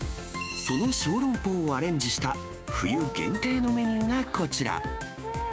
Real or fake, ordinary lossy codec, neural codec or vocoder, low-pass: fake; none; codec, 16 kHz, 6 kbps, DAC; none